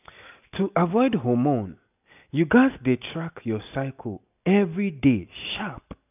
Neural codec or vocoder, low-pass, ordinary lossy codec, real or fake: none; 3.6 kHz; none; real